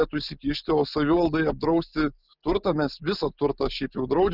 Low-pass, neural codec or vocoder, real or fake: 5.4 kHz; none; real